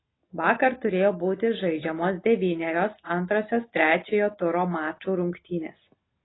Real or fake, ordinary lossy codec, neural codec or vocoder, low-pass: real; AAC, 16 kbps; none; 7.2 kHz